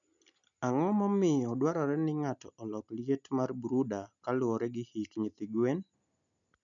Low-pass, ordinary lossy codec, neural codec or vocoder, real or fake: 7.2 kHz; none; none; real